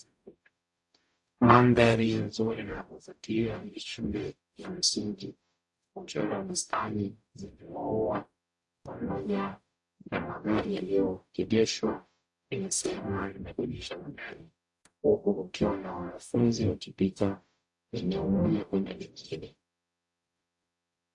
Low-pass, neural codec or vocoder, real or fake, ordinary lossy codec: 10.8 kHz; codec, 44.1 kHz, 0.9 kbps, DAC; fake; AAC, 64 kbps